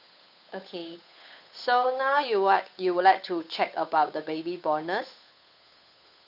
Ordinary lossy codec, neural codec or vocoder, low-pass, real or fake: none; codec, 16 kHz, 8 kbps, FunCodec, trained on Chinese and English, 25 frames a second; 5.4 kHz; fake